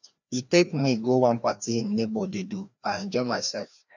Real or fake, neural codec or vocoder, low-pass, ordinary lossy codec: fake; codec, 16 kHz, 1 kbps, FreqCodec, larger model; 7.2 kHz; none